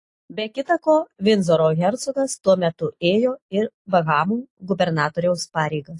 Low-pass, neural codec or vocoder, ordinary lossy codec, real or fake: 10.8 kHz; none; AAC, 48 kbps; real